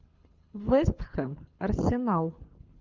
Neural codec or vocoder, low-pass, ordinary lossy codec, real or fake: codec, 24 kHz, 6 kbps, HILCodec; 7.2 kHz; Opus, 32 kbps; fake